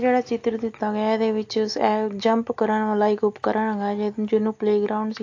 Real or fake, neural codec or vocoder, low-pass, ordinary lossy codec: real; none; 7.2 kHz; AAC, 48 kbps